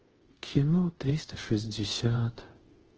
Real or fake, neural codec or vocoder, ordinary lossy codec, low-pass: fake; codec, 24 kHz, 0.5 kbps, DualCodec; Opus, 16 kbps; 7.2 kHz